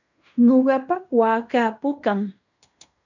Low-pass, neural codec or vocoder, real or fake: 7.2 kHz; codec, 16 kHz in and 24 kHz out, 0.9 kbps, LongCat-Audio-Codec, fine tuned four codebook decoder; fake